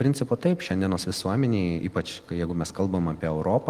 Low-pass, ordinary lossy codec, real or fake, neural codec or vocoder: 14.4 kHz; Opus, 24 kbps; real; none